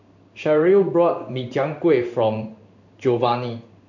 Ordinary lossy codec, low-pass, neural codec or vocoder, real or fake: none; 7.2 kHz; codec, 16 kHz in and 24 kHz out, 1 kbps, XY-Tokenizer; fake